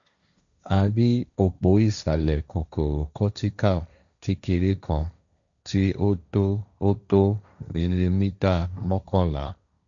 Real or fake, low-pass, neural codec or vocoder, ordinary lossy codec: fake; 7.2 kHz; codec, 16 kHz, 1.1 kbps, Voila-Tokenizer; none